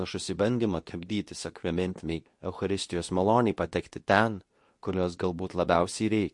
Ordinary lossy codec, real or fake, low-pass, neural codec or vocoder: MP3, 48 kbps; fake; 10.8 kHz; codec, 24 kHz, 0.9 kbps, WavTokenizer, medium speech release version 2